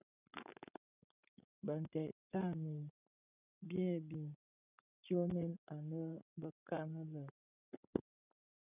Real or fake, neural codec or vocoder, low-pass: fake; codec, 16 kHz, 16 kbps, FreqCodec, larger model; 3.6 kHz